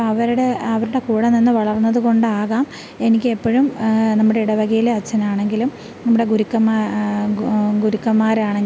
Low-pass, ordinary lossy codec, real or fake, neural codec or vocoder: none; none; real; none